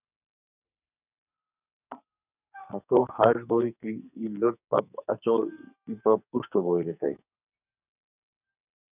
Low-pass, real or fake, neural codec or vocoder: 3.6 kHz; fake; codec, 44.1 kHz, 2.6 kbps, SNAC